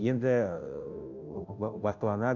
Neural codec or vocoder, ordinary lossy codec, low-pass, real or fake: codec, 16 kHz, 0.5 kbps, FunCodec, trained on Chinese and English, 25 frames a second; none; 7.2 kHz; fake